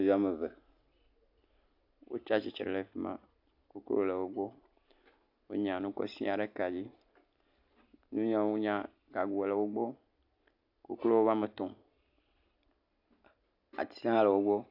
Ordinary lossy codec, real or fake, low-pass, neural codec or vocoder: AAC, 48 kbps; real; 5.4 kHz; none